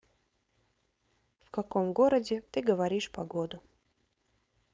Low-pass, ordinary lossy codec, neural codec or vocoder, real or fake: none; none; codec, 16 kHz, 4.8 kbps, FACodec; fake